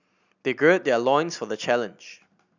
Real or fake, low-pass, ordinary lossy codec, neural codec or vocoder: real; 7.2 kHz; none; none